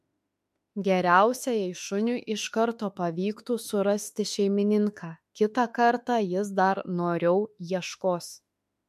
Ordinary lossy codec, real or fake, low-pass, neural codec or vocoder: MP3, 64 kbps; fake; 14.4 kHz; autoencoder, 48 kHz, 32 numbers a frame, DAC-VAE, trained on Japanese speech